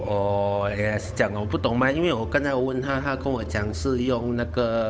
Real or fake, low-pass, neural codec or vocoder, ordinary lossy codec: fake; none; codec, 16 kHz, 8 kbps, FunCodec, trained on Chinese and English, 25 frames a second; none